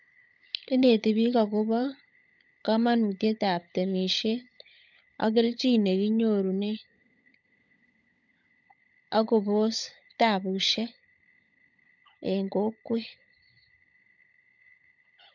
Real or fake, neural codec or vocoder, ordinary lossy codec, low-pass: fake; codec, 16 kHz, 16 kbps, FunCodec, trained on LibriTTS, 50 frames a second; none; 7.2 kHz